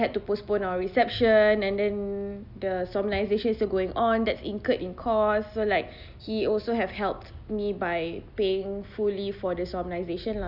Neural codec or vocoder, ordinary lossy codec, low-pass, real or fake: none; Opus, 64 kbps; 5.4 kHz; real